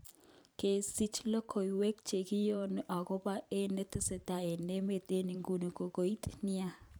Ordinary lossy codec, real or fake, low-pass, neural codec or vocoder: none; fake; none; vocoder, 44.1 kHz, 128 mel bands every 512 samples, BigVGAN v2